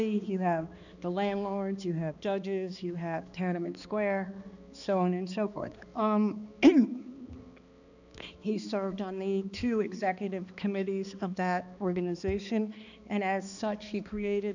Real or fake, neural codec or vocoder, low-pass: fake; codec, 16 kHz, 2 kbps, X-Codec, HuBERT features, trained on balanced general audio; 7.2 kHz